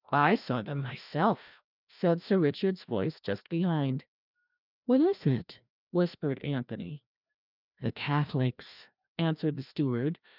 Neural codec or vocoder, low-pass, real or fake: codec, 16 kHz, 1 kbps, FreqCodec, larger model; 5.4 kHz; fake